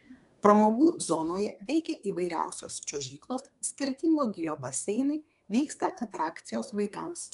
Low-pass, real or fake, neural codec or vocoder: 10.8 kHz; fake; codec, 24 kHz, 1 kbps, SNAC